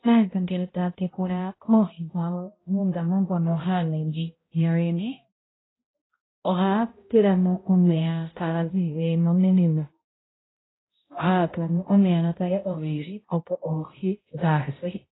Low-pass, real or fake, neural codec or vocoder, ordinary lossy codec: 7.2 kHz; fake; codec, 16 kHz, 0.5 kbps, X-Codec, HuBERT features, trained on balanced general audio; AAC, 16 kbps